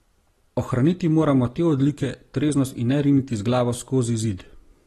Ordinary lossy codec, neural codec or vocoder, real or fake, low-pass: AAC, 32 kbps; none; real; 19.8 kHz